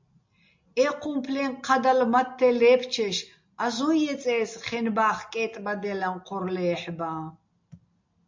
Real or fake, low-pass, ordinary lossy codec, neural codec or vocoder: real; 7.2 kHz; MP3, 48 kbps; none